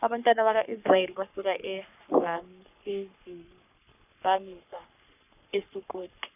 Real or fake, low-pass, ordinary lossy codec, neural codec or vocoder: fake; 3.6 kHz; none; codec, 44.1 kHz, 3.4 kbps, Pupu-Codec